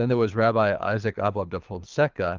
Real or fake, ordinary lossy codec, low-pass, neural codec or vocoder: fake; Opus, 32 kbps; 7.2 kHz; codec, 24 kHz, 6 kbps, HILCodec